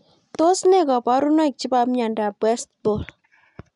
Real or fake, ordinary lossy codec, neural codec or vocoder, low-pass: real; none; none; 10.8 kHz